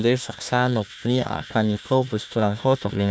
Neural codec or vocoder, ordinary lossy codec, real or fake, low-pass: codec, 16 kHz, 1 kbps, FunCodec, trained on Chinese and English, 50 frames a second; none; fake; none